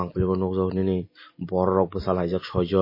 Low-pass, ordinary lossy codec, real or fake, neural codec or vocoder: 5.4 kHz; MP3, 24 kbps; real; none